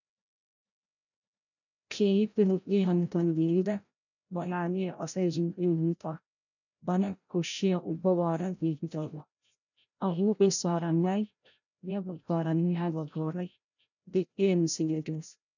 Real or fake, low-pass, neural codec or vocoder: fake; 7.2 kHz; codec, 16 kHz, 0.5 kbps, FreqCodec, larger model